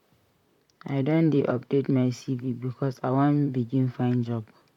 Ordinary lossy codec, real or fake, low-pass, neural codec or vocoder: none; fake; 19.8 kHz; vocoder, 44.1 kHz, 128 mel bands, Pupu-Vocoder